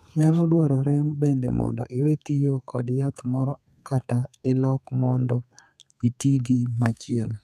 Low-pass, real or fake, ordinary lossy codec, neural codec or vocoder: 14.4 kHz; fake; none; codec, 32 kHz, 1.9 kbps, SNAC